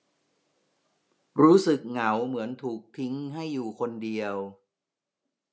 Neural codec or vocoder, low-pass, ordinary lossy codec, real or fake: none; none; none; real